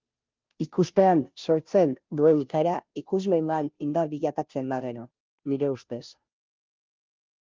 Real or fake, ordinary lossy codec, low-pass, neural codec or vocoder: fake; Opus, 32 kbps; 7.2 kHz; codec, 16 kHz, 0.5 kbps, FunCodec, trained on Chinese and English, 25 frames a second